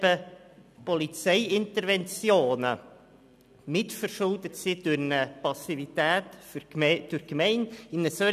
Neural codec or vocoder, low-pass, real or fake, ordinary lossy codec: none; 14.4 kHz; real; none